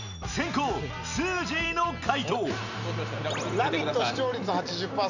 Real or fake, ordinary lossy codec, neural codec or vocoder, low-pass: real; none; none; 7.2 kHz